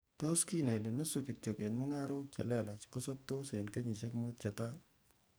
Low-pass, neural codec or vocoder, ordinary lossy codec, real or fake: none; codec, 44.1 kHz, 2.6 kbps, SNAC; none; fake